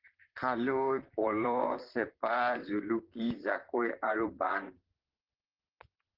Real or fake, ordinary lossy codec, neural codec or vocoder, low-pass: fake; Opus, 16 kbps; vocoder, 44.1 kHz, 128 mel bands, Pupu-Vocoder; 5.4 kHz